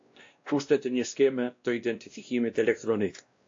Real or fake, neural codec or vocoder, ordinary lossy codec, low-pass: fake; codec, 16 kHz, 1 kbps, X-Codec, WavLM features, trained on Multilingual LibriSpeech; AAC, 48 kbps; 7.2 kHz